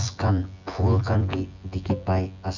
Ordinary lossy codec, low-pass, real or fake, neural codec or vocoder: none; 7.2 kHz; fake; vocoder, 24 kHz, 100 mel bands, Vocos